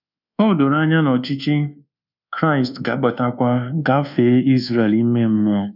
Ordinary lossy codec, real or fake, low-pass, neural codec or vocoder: none; fake; 5.4 kHz; codec, 24 kHz, 1.2 kbps, DualCodec